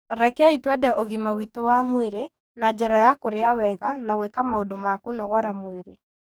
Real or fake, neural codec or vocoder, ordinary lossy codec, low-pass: fake; codec, 44.1 kHz, 2.6 kbps, DAC; none; none